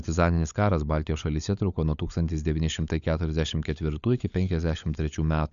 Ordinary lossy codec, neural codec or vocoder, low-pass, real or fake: MP3, 96 kbps; codec, 16 kHz, 16 kbps, FunCodec, trained on LibriTTS, 50 frames a second; 7.2 kHz; fake